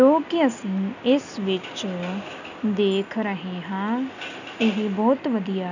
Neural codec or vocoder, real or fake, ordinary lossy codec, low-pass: none; real; none; 7.2 kHz